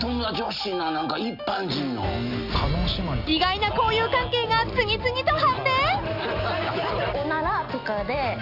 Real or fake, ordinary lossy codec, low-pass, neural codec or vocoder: real; none; 5.4 kHz; none